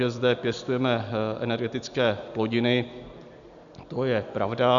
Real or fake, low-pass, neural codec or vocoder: real; 7.2 kHz; none